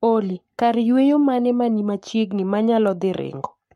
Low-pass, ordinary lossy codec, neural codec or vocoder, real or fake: 19.8 kHz; MP3, 64 kbps; codec, 44.1 kHz, 7.8 kbps, Pupu-Codec; fake